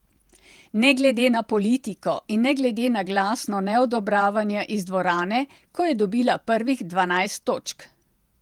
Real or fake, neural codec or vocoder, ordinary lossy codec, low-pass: fake; vocoder, 48 kHz, 128 mel bands, Vocos; Opus, 32 kbps; 19.8 kHz